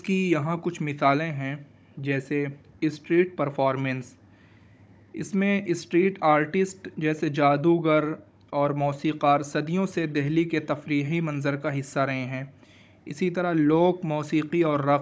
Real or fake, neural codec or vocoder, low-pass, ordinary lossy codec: fake; codec, 16 kHz, 16 kbps, FunCodec, trained on Chinese and English, 50 frames a second; none; none